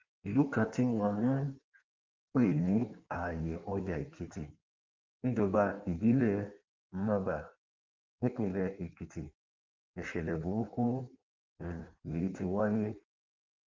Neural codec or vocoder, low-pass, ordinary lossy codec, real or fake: codec, 16 kHz in and 24 kHz out, 1.1 kbps, FireRedTTS-2 codec; 7.2 kHz; Opus, 32 kbps; fake